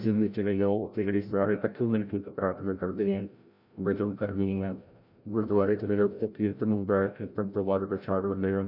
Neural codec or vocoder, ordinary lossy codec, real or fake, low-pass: codec, 16 kHz, 0.5 kbps, FreqCodec, larger model; none; fake; 5.4 kHz